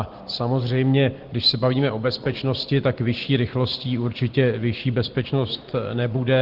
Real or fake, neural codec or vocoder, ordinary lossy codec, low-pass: real; none; Opus, 16 kbps; 5.4 kHz